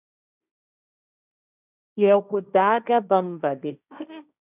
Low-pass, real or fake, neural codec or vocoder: 3.6 kHz; fake; codec, 16 kHz, 1.1 kbps, Voila-Tokenizer